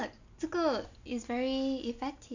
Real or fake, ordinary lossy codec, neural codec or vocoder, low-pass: real; none; none; 7.2 kHz